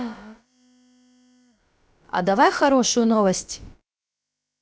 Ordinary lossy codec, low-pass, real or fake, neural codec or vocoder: none; none; fake; codec, 16 kHz, about 1 kbps, DyCAST, with the encoder's durations